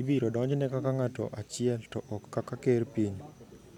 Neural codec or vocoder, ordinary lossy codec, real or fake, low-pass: none; none; real; 19.8 kHz